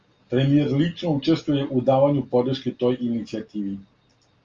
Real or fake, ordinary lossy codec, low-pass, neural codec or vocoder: real; Opus, 32 kbps; 7.2 kHz; none